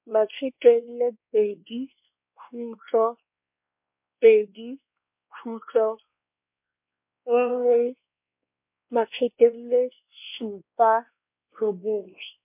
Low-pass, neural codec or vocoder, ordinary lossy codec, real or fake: 3.6 kHz; codec, 16 kHz, 2 kbps, X-Codec, WavLM features, trained on Multilingual LibriSpeech; MP3, 32 kbps; fake